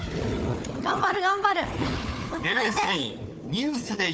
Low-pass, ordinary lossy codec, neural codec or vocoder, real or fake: none; none; codec, 16 kHz, 4 kbps, FunCodec, trained on Chinese and English, 50 frames a second; fake